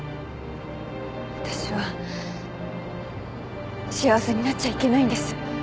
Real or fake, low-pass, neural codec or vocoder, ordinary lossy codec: real; none; none; none